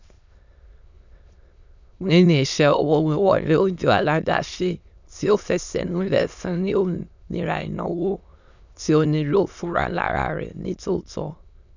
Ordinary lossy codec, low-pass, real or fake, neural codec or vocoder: none; 7.2 kHz; fake; autoencoder, 22.05 kHz, a latent of 192 numbers a frame, VITS, trained on many speakers